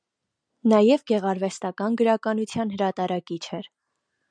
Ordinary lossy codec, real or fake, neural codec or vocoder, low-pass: MP3, 96 kbps; real; none; 9.9 kHz